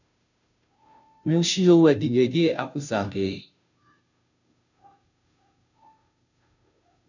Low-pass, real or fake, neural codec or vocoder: 7.2 kHz; fake; codec, 16 kHz, 0.5 kbps, FunCodec, trained on Chinese and English, 25 frames a second